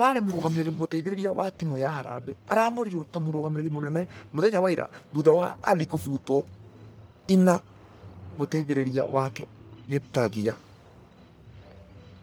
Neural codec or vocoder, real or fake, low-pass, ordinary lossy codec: codec, 44.1 kHz, 1.7 kbps, Pupu-Codec; fake; none; none